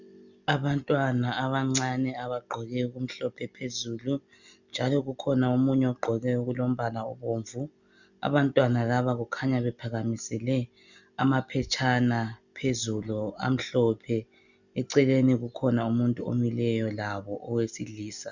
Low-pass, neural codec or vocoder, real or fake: 7.2 kHz; none; real